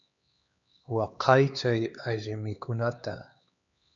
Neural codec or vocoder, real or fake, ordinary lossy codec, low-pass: codec, 16 kHz, 2 kbps, X-Codec, HuBERT features, trained on LibriSpeech; fake; MP3, 96 kbps; 7.2 kHz